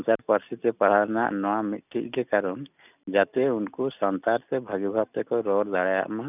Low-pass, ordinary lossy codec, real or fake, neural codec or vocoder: 3.6 kHz; none; real; none